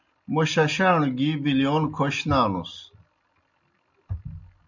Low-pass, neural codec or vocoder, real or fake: 7.2 kHz; none; real